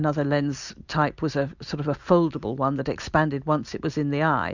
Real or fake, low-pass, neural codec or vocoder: real; 7.2 kHz; none